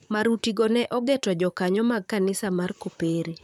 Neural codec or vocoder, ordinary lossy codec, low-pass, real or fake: vocoder, 44.1 kHz, 128 mel bands, Pupu-Vocoder; none; 19.8 kHz; fake